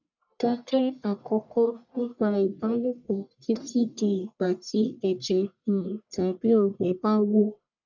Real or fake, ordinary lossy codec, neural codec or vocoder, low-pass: fake; none; codec, 44.1 kHz, 1.7 kbps, Pupu-Codec; 7.2 kHz